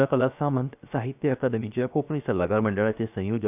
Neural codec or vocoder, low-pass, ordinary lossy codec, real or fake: codec, 16 kHz, 0.7 kbps, FocalCodec; 3.6 kHz; none; fake